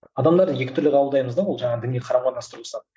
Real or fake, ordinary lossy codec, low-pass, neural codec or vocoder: real; none; none; none